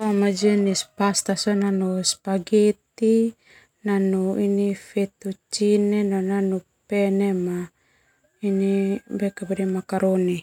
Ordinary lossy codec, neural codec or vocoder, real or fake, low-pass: none; none; real; 19.8 kHz